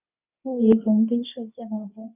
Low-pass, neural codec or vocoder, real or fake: 3.6 kHz; codec, 24 kHz, 0.9 kbps, WavTokenizer, medium speech release version 2; fake